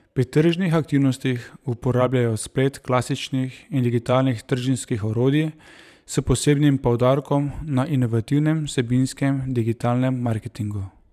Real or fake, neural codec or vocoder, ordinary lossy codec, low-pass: fake; vocoder, 44.1 kHz, 128 mel bands every 512 samples, BigVGAN v2; none; 14.4 kHz